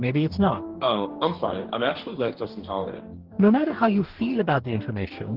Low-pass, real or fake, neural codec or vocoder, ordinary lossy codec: 5.4 kHz; fake; codec, 44.1 kHz, 2.6 kbps, DAC; Opus, 16 kbps